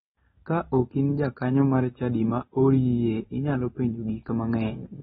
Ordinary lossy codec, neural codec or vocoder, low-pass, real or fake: AAC, 16 kbps; none; 19.8 kHz; real